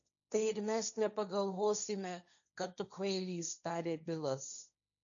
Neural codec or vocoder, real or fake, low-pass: codec, 16 kHz, 1.1 kbps, Voila-Tokenizer; fake; 7.2 kHz